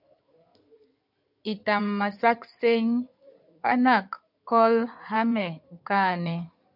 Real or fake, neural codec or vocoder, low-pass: fake; codec, 16 kHz in and 24 kHz out, 2.2 kbps, FireRedTTS-2 codec; 5.4 kHz